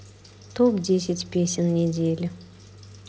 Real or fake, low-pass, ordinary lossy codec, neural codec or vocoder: real; none; none; none